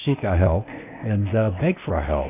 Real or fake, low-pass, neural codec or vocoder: fake; 3.6 kHz; codec, 16 kHz, 0.8 kbps, ZipCodec